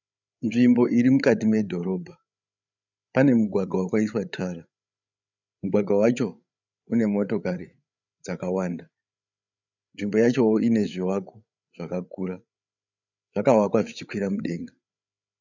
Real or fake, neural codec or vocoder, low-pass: fake; codec, 16 kHz, 8 kbps, FreqCodec, larger model; 7.2 kHz